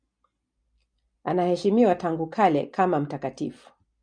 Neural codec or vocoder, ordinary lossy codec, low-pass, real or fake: none; MP3, 96 kbps; 9.9 kHz; real